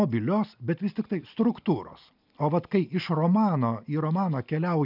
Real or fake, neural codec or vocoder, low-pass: real; none; 5.4 kHz